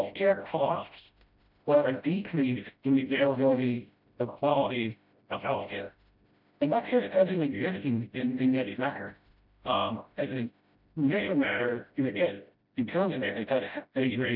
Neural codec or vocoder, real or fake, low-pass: codec, 16 kHz, 0.5 kbps, FreqCodec, smaller model; fake; 5.4 kHz